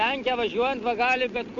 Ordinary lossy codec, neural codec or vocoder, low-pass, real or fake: MP3, 48 kbps; none; 7.2 kHz; real